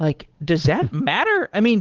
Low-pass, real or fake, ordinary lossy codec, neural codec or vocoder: 7.2 kHz; fake; Opus, 16 kbps; codec, 16 kHz, 8 kbps, FunCodec, trained on Chinese and English, 25 frames a second